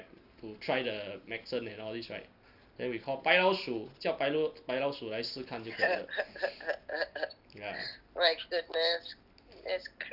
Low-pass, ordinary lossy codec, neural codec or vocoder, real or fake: 5.4 kHz; none; none; real